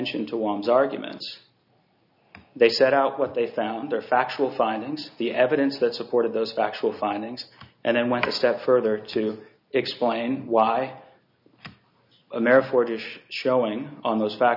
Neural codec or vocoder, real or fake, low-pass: none; real; 5.4 kHz